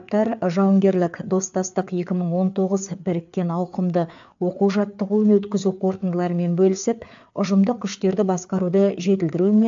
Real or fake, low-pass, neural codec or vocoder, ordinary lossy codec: fake; 7.2 kHz; codec, 16 kHz, 4 kbps, FreqCodec, larger model; AAC, 64 kbps